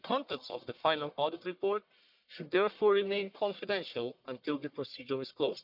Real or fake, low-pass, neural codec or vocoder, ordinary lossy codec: fake; 5.4 kHz; codec, 44.1 kHz, 1.7 kbps, Pupu-Codec; none